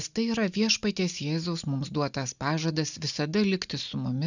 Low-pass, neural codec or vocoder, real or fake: 7.2 kHz; none; real